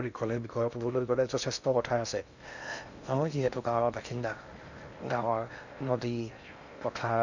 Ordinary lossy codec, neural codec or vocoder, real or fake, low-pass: none; codec, 16 kHz in and 24 kHz out, 0.6 kbps, FocalCodec, streaming, 2048 codes; fake; 7.2 kHz